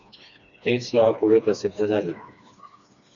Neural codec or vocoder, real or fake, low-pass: codec, 16 kHz, 2 kbps, FreqCodec, smaller model; fake; 7.2 kHz